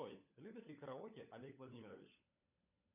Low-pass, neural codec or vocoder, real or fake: 3.6 kHz; codec, 16 kHz, 16 kbps, FunCodec, trained on LibriTTS, 50 frames a second; fake